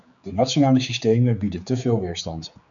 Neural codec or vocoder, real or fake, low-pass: codec, 16 kHz, 4 kbps, X-Codec, HuBERT features, trained on general audio; fake; 7.2 kHz